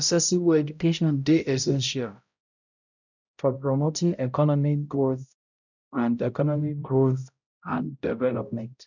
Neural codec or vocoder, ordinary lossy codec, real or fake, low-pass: codec, 16 kHz, 0.5 kbps, X-Codec, HuBERT features, trained on balanced general audio; none; fake; 7.2 kHz